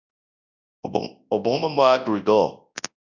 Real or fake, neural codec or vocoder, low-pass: fake; codec, 24 kHz, 0.9 kbps, WavTokenizer, large speech release; 7.2 kHz